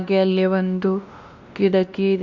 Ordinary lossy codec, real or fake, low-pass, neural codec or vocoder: none; fake; 7.2 kHz; codec, 16 kHz, 1 kbps, X-Codec, WavLM features, trained on Multilingual LibriSpeech